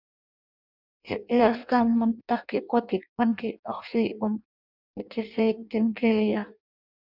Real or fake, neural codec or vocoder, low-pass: fake; codec, 16 kHz in and 24 kHz out, 0.6 kbps, FireRedTTS-2 codec; 5.4 kHz